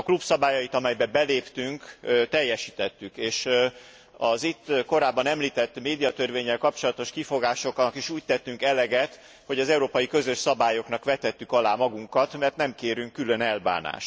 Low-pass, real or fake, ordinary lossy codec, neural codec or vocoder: none; real; none; none